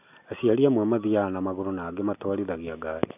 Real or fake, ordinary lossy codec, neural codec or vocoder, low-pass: real; none; none; 3.6 kHz